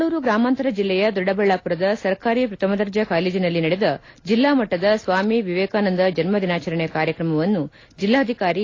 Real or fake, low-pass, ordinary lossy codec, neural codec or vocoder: real; 7.2 kHz; AAC, 32 kbps; none